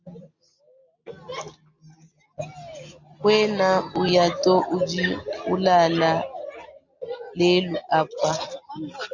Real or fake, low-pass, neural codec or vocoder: real; 7.2 kHz; none